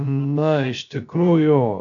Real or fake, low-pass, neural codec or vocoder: fake; 7.2 kHz; codec, 16 kHz, 0.3 kbps, FocalCodec